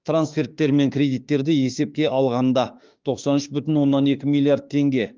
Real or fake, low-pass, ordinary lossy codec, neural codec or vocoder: fake; 7.2 kHz; Opus, 32 kbps; autoencoder, 48 kHz, 32 numbers a frame, DAC-VAE, trained on Japanese speech